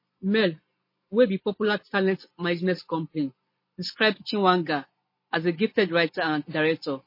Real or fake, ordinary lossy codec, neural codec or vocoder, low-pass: real; MP3, 24 kbps; none; 5.4 kHz